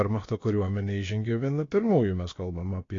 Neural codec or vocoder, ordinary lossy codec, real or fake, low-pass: codec, 16 kHz, about 1 kbps, DyCAST, with the encoder's durations; AAC, 32 kbps; fake; 7.2 kHz